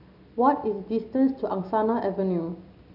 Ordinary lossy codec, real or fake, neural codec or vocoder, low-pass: none; real; none; 5.4 kHz